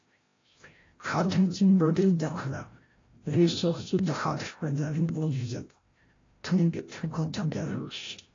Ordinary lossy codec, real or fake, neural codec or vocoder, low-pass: AAC, 32 kbps; fake; codec, 16 kHz, 0.5 kbps, FreqCodec, larger model; 7.2 kHz